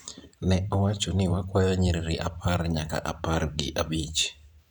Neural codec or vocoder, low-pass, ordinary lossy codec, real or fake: vocoder, 44.1 kHz, 128 mel bands every 512 samples, BigVGAN v2; 19.8 kHz; none; fake